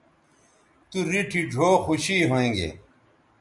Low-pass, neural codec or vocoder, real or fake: 10.8 kHz; none; real